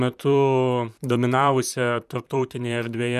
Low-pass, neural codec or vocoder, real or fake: 14.4 kHz; vocoder, 44.1 kHz, 128 mel bands, Pupu-Vocoder; fake